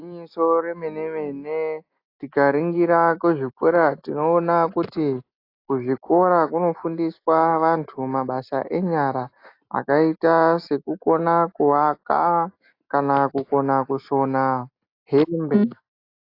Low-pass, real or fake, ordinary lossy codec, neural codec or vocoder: 5.4 kHz; real; AAC, 32 kbps; none